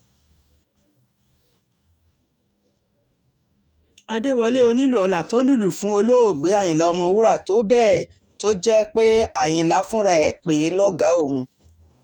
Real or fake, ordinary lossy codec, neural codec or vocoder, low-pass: fake; none; codec, 44.1 kHz, 2.6 kbps, DAC; 19.8 kHz